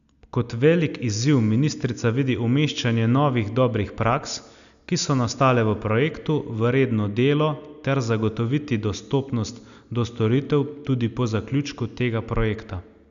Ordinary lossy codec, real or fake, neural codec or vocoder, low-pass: none; real; none; 7.2 kHz